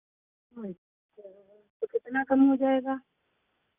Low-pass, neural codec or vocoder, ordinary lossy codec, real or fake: 3.6 kHz; none; Opus, 64 kbps; real